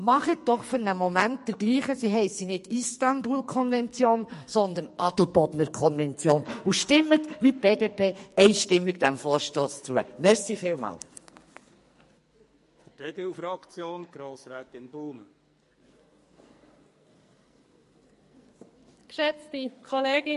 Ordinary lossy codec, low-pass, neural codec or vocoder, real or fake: MP3, 48 kbps; 14.4 kHz; codec, 44.1 kHz, 2.6 kbps, SNAC; fake